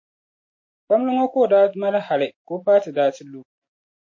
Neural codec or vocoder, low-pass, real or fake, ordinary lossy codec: none; 7.2 kHz; real; MP3, 32 kbps